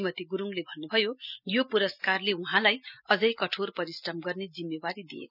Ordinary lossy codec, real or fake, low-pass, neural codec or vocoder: none; real; 5.4 kHz; none